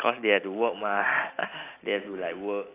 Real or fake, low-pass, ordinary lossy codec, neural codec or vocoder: real; 3.6 kHz; AAC, 24 kbps; none